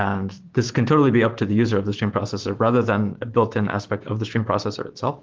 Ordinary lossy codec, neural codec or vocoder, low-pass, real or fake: Opus, 16 kbps; autoencoder, 48 kHz, 128 numbers a frame, DAC-VAE, trained on Japanese speech; 7.2 kHz; fake